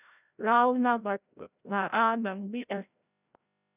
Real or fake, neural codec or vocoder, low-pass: fake; codec, 16 kHz, 0.5 kbps, FreqCodec, larger model; 3.6 kHz